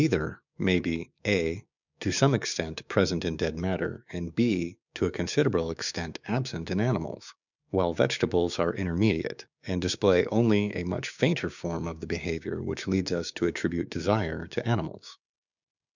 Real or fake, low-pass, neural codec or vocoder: fake; 7.2 kHz; codec, 16 kHz, 6 kbps, DAC